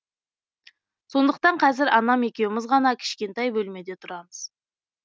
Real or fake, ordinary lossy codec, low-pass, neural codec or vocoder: real; none; none; none